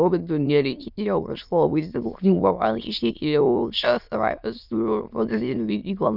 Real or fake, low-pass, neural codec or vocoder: fake; 5.4 kHz; autoencoder, 22.05 kHz, a latent of 192 numbers a frame, VITS, trained on many speakers